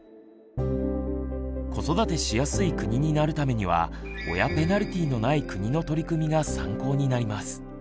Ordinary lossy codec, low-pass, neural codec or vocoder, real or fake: none; none; none; real